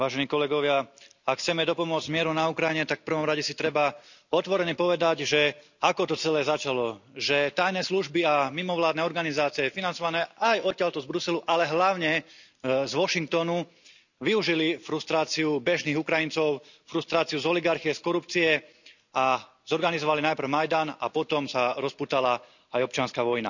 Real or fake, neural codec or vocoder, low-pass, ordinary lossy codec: real; none; 7.2 kHz; none